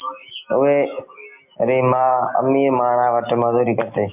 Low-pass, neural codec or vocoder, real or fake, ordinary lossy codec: 3.6 kHz; none; real; MP3, 32 kbps